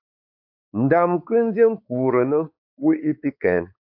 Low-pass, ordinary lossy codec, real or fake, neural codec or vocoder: 5.4 kHz; AAC, 48 kbps; fake; codec, 16 kHz, 4 kbps, X-Codec, WavLM features, trained on Multilingual LibriSpeech